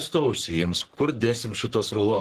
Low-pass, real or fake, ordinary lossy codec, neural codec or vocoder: 14.4 kHz; fake; Opus, 16 kbps; codec, 44.1 kHz, 3.4 kbps, Pupu-Codec